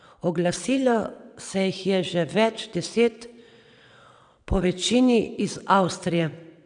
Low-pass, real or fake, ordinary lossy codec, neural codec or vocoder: 9.9 kHz; fake; none; vocoder, 22.05 kHz, 80 mel bands, WaveNeXt